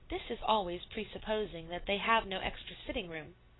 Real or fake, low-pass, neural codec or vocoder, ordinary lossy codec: real; 7.2 kHz; none; AAC, 16 kbps